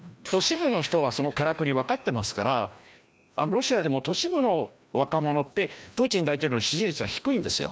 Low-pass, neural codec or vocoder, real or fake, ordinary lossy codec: none; codec, 16 kHz, 1 kbps, FreqCodec, larger model; fake; none